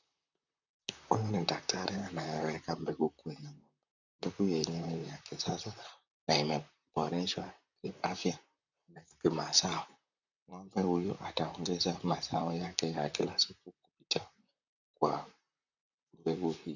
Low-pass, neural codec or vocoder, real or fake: 7.2 kHz; vocoder, 44.1 kHz, 128 mel bands, Pupu-Vocoder; fake